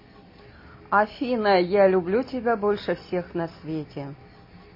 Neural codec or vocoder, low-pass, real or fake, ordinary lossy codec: none; 5.4 kHz; real; MP3, 24 kbps